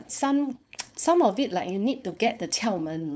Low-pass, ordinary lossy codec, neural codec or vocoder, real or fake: none; none; codec, 16 kHz, 4.8 kbps, FACodec; fake